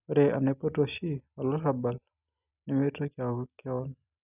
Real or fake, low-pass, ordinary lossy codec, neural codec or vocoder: real; 3.6 kHz; none; none